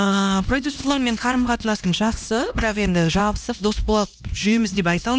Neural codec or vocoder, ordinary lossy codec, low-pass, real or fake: codec, 16 kHz, 1 kbps, X-Codec, HuBERT features, trained on LibriSpeech; none; none; fake